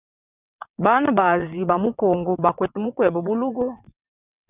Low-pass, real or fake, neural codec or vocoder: 3.6 kHz; fake; vocoder, 22.05 kHz, 80 mel bands, WaveNeXt